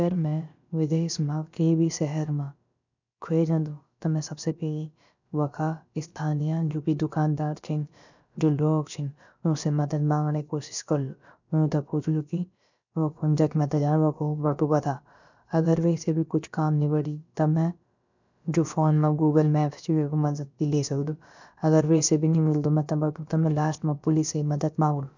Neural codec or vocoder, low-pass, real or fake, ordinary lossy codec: codec, 16 kHz, about 1 kbps, DyCAST, with the encoder's durations; 7.2 kHz; fake; none